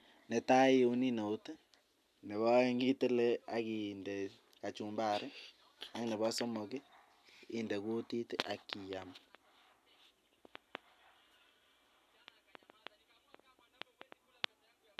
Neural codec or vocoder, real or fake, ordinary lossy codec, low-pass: none; real; none; 14.4 kHz